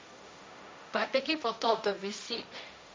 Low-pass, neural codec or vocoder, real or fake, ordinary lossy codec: none; codec, 16 kHz, 1.1 kbps, Voila-Tokenizer; fake; none